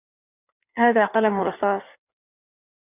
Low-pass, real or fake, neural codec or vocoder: 3.6 kHz; fake; codec, 16 kHz in and 24 kHz out, 1.1 kbps, FireRedTTS-2 codec